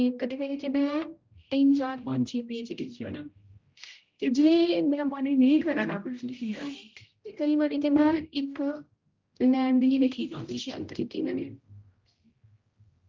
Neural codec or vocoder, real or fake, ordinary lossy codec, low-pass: codec, 16 kHz, 0.5 kbps, X-Codec, HuBERT features, trained on general audio; fake; Opus, 32 kbps; 7.2 kHz